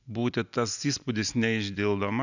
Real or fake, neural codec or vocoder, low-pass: real; none; 7.2 kHz